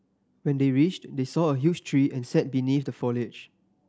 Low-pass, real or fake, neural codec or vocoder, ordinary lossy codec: none; real; none; none